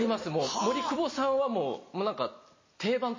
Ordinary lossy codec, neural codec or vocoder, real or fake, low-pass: MP3, 32 kbps; vocoder, 44.1 kHz, 128 mel bands every 256 samples, BigVGAN v2; fake; 7.2 kHz